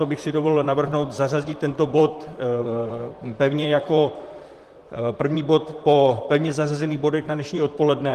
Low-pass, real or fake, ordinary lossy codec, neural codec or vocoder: 14.4 kHz; fake; Opus, 24 kbps; vocoder, 44.1 kHz, 128 mel bands, Pupu-Vocoder